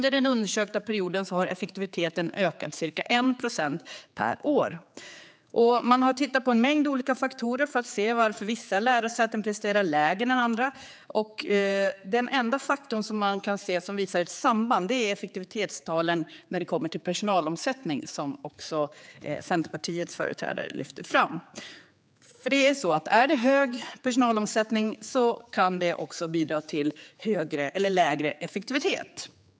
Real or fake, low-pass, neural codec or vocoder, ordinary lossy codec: fake; none; codec, 16 kHz, 4 kbps, X-Codec, HuBERT features, trained on general audio; none